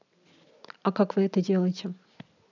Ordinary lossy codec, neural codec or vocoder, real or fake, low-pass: none; none; real; 7.2 kHz